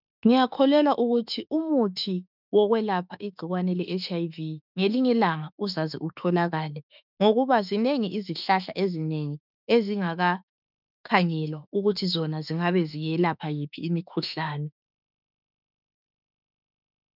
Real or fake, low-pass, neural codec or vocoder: fake; 5.4 kHz; autoencoder, 48 kHz, 32 numbers a frame, DAC-VAE, trained on Japanese speech